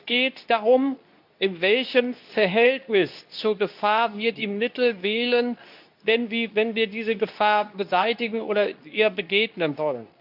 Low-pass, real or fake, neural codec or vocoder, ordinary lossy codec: 5.4 kHz; fake; codec, 24 kHz, 0.9 kbps, WavTokenizer, medium speech release version 2; none